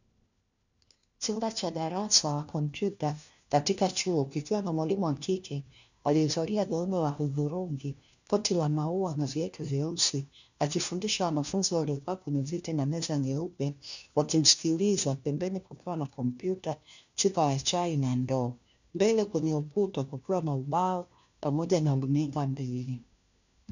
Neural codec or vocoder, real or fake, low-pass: codec, 16 kHz, 1 kbps, FunCodec, trained on LibriTTS, 50 frames a second; fake; 7.2 kHz